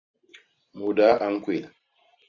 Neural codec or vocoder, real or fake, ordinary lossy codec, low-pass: vocoder, 44.1 kHz, 128 mel bands every 512 samples, BigVGAN v2; fake; Opus, 64 kbps; 7.2 kHz